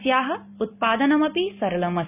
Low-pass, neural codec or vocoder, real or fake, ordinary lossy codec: 3.6 kHz; none; real; none